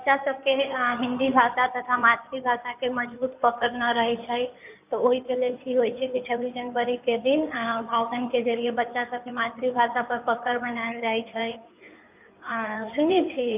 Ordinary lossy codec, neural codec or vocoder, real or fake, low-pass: none; codec, 16 kHz in and 24 kHz out, 2.2 kbps, FireRedTTS-2 codec; fake; 3.6 kHz